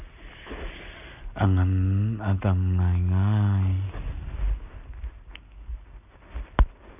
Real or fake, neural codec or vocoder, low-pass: real; none; 3.6 kHz